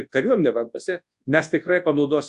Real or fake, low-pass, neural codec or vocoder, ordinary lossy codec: fake; 10.8 kHz; codec, 24 kHz, 0.9 kbps, WavTokenizer, large speech release; AAC, 64 kbps